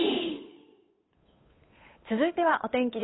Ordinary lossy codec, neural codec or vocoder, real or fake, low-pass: AAC, 16 kbps; codec, 16 kHz, 8 kbps, FunCodec, trained on Chinese and English, 25 frames a second; fake; 7.2 kHz